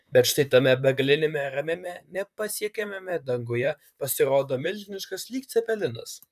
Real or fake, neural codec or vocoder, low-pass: fake; vocoder, 44.1 kHz, 128 mel bands, Pupu-Vocoder; 14.4 kHz